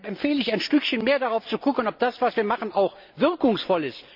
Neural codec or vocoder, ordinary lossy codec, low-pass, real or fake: none; MP3, 48 kbps; 5.4 kHz; real